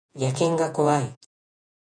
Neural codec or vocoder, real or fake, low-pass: vocoder, 48 kHz, 128 mel bands, Vocos; fake; 9.9 kHz